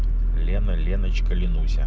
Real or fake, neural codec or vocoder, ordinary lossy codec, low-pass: real; none; none; none